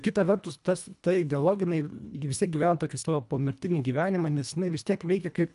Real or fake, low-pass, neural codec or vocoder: fake; 10.8 kHz; codec, 24 kHz, 1.5 kbps, HILCodec